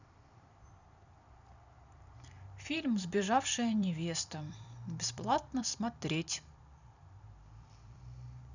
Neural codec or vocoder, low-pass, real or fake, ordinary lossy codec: vocoder, 44.1 kHz, 128 mel bands every 256 samples, BigVGAN v2; 7.2 kHz; fake; none